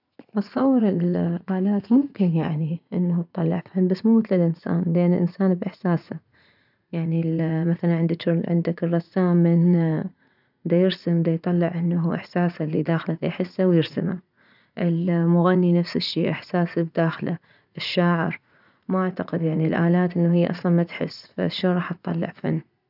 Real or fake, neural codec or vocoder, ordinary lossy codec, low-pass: fake; vocoder, 44.1 kHz, 80 mel bands, Vocos; none; 5.4 kHz